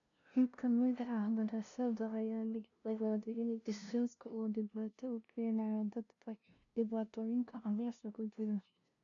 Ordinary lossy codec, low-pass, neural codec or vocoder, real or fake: none; 7.2 kHz; codec, 16 kHz, 0.5 kbps, FunCodec, trained on LibriTTS, 25 frames a second; fake